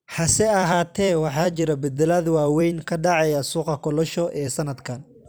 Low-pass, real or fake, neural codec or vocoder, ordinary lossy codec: none; fake; vocoder, 44.1 kHz, 128 mel bands every 256 samples, BigVGAN v2; none